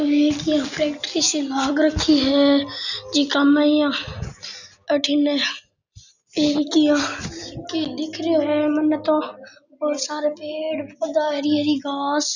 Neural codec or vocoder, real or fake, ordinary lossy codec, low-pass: none; real; none; 7.2 kHz